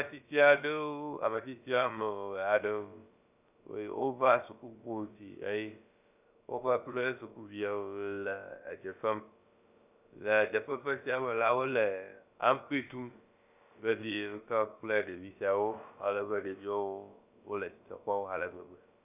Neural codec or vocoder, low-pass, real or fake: codec, 16 kHz, about 1 kbps, DyCAST, with the encoder's durations; 3.6 kHz; fake